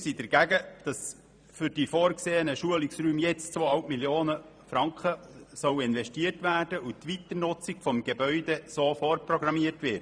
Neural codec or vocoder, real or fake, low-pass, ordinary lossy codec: vocoder, 44.1 kHz, 128 mel bands every 512 samples, BigVGAN v2; fake; 9.9 kHz; none